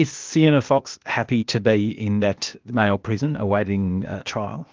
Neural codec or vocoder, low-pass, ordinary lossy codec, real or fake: codec, 16 kHz, 0.8 kbps, ZipCodec; 7.2 kHz; Opus, 32 kbps; fake